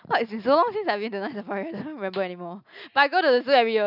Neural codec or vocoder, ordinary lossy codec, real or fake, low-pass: none; none; real; 5.4 kHz